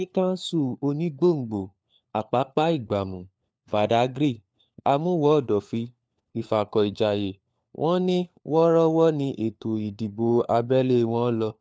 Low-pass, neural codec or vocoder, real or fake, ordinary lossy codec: none; codec, 16 kHz, 4 kbps, FunCodec, trained on LibriTTS, 50 frames a second; fake; none